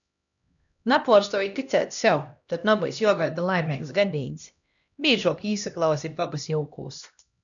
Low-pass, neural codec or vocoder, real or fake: 7.2 kHz; codec, 16 kHz, 1 kbps, X-Codec, HuBERT features, trained on LibriSpeech; fake